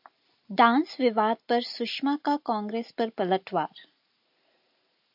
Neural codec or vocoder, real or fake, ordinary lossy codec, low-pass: none; real; AAC, 48 kbps; 5.4 kHz